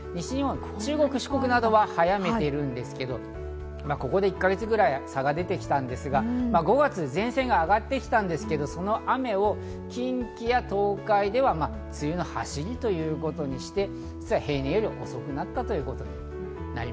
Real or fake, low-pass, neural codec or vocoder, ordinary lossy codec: real; none; none; none